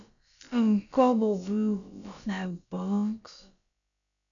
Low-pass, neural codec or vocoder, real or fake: 7.2 kHz; codec, 16 kHz, about 1 kbps, DyCAST, with the encoder's durations; fake